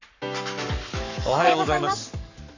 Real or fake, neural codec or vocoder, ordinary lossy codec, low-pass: fake; codec, 44.1 kHz, 7.8 kbps, Pupu-Codec; none; 7.2 kHz